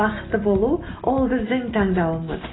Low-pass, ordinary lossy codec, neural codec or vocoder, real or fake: 7.2 kHz; AAC, 16 kbps; none; real